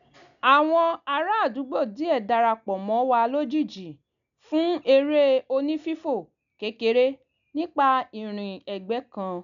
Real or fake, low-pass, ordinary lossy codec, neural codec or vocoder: real; 7.2 kHz; none; none